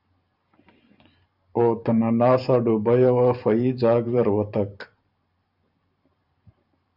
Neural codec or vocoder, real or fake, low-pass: vocoder, 44.1 kHz, 128 mel bands every 512 samples, BigVGAN v2; fake; 5.4 kHz